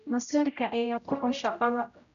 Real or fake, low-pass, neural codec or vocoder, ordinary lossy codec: fake; 7.2 kHz; codec, 16 kHz, 0.5 kbps, X-Codec, HuBERT features, trained on general audio; none